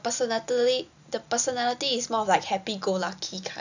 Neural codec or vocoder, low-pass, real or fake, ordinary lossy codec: none; 7.2 kHz; real; none